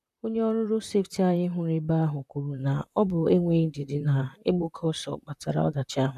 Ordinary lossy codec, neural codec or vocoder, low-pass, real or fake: none; vocoder, 44.1 kHz, 128 mel bands, Pupu-Vocoder; 14.4 kHz; fake